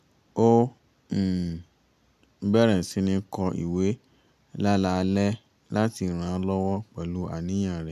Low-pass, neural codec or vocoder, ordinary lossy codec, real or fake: 14.4 kHz; none; none; real